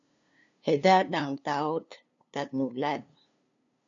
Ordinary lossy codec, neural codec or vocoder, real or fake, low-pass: MP3, 96 kbps; codec, 16 kHz, 2 kbps, FunCodec, trained on LibriTTS, 25 frames a second; fake; 7.2 kHz